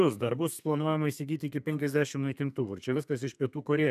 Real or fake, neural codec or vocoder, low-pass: fake; codec, 32 kHz, 1.9 kbps, SNAC; 14.4 kHz